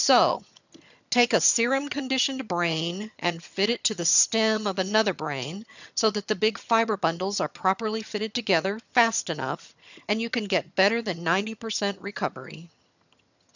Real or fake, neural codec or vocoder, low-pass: fake; vocoder, 22.05 kHz, 80 mel bands, HiFi-GAN; 7.2 kHz